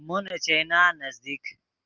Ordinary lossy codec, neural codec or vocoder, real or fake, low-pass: Opus, 32 kbps; none; real; 7.2 kHz